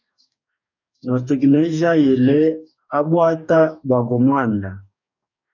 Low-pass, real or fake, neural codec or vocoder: 7.2 kHz; fake; codec, 44.1 kHz, 2.6 kbps, DAC